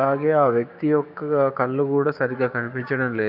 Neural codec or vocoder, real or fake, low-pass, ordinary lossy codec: codec, 16 kHz, 6 kbps, DAC; fake; 5.4 kHz; none